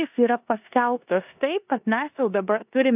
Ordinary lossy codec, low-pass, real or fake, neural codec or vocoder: AAC, 32 kbps; 3.6 kHz; fake; codec, 16 kHz in and 24 kHz out, 0.9 kbps, LongCat-Audio-Codec, four codebook decoder